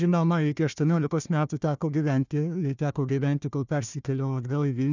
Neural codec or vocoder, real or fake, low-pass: codec, 16 kHz, 1 kbps, FunCodec, trained on Chinese and English, 50 frames a second; fake; 7.2 kHz